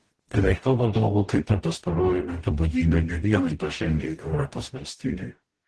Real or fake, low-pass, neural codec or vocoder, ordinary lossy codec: fake; 10.8 kHz; codec, 44.1 kHz, 0.9 kbps, DAC; Opus, 16 kbps